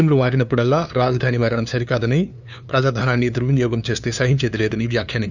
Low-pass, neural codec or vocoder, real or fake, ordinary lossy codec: 7.2 kHz; codec, 16 kHz, 2 kbps, FunCodec, trained on LibriTTS, 25 frames a second; fake; none